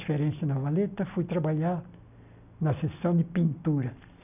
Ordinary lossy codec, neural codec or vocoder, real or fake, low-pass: none; none; real; 3.6 kHz